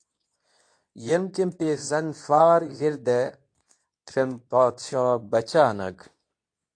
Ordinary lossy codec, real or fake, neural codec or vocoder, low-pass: AAC, 48 kbps; fake; codec, 24 kHz, 0.9 kbps, WavTokenizer, medium speech release version 2; 9.9 kHz